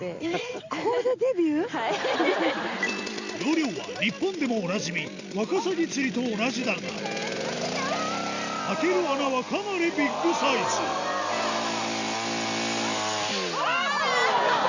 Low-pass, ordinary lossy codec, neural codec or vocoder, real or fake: 7.2 kHz; Opus, 64 kbps; none; real